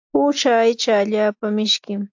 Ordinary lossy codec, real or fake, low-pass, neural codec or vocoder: AAC, 48 kbps; real; 7.2 kHz; none